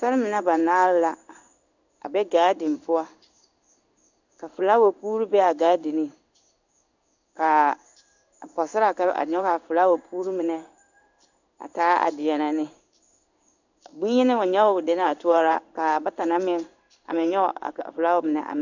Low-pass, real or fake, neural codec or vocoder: 7.2 kHz; fake; codec, 16 kHz in and 24 kHz out, 1 kbps, XY-Tokenizer